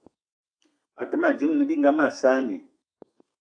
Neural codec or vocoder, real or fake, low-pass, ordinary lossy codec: codec, 32 kHz, 1.9 kbps, SNAC; fake; 9.9 kHz; AAC, 64 kbps